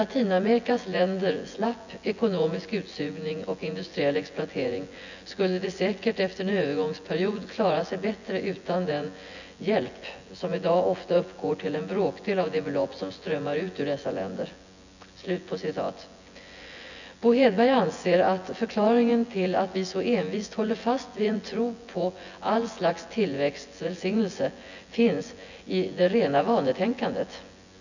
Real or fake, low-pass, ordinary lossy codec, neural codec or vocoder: fake; 7.2 kHz; none; vocoder, 24 kHz, 100 mel bands, Vocos